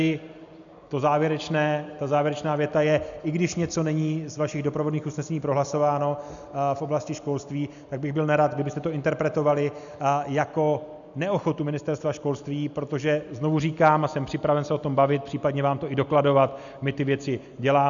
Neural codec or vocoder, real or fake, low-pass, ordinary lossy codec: none; real; 7.2 kHz; MP3, 96 kbps